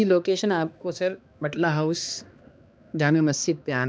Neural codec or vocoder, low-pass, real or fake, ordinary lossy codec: codec, 16 kHz, 2 kbps, X-Codec, HuBERT features, trained on balanced general audio; none; fake; none